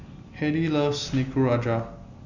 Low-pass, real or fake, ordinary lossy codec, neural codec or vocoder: 7.2 kHz; real; none; none